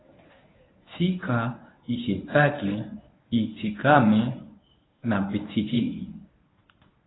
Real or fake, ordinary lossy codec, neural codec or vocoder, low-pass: fake; AAC, 16 kbps; codec, 24 kHz, 0.9 kbps, WavTokenizer, medium speech release version 1; 7.2 kHz